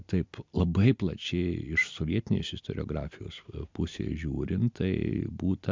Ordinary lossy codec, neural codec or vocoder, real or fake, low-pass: MP3, 64 kbps; none; real; 7.2 kHz